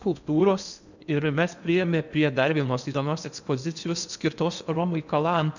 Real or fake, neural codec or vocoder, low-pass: fake; codec, 16 kHz in and 24 kHz out, 0.8 kbps, FocalCodec, streaming, 65536 codes; 7.2 kHz